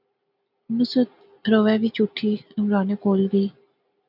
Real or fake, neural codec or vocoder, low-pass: real; none; 5.4 kHz